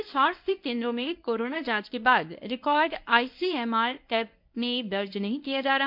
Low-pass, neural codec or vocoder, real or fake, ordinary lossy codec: 5.4 kHz; codec, 24 kHz, 0.9 kbps, WavTokenizer, medium speech release version 1; fake; MP3, 48 kbps